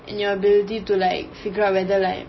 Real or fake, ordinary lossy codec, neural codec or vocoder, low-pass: real; MP3, 24 kbps; none; 7.2 kHz